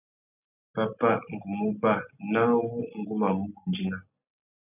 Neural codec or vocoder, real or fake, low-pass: none; real; 3.6 kHz